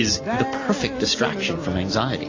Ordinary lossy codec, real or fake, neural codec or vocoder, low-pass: AAC, 32 kbps; real; none; 7.2 kHz